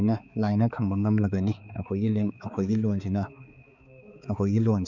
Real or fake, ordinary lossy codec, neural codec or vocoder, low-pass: fake; none; codec, 16 kHz, 4 kbps, X-Codec, HuBERT features, trained on balanced general audio; 7.2 kHz